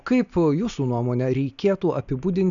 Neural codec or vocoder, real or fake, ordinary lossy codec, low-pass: none; real; MP3, 96 kbps; 7.2 kHz